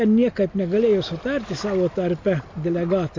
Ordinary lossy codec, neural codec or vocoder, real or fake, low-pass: MP3, 48 kbps; none; real; 7.2 kHz